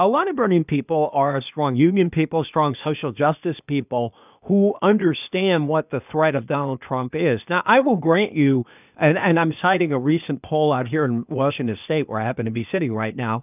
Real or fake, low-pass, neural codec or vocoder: fake; 3.6 kHz; codec, 16 kHz, 0.8 kbps, ZipCodec